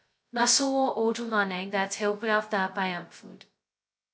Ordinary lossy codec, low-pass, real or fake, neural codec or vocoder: none; none; fake; codec, 16 kHz, 0.2 kbps, FocalCodec